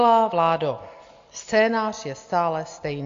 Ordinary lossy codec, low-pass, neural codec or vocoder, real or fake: AAC, 48 kbps; 7.2 kHz; none; real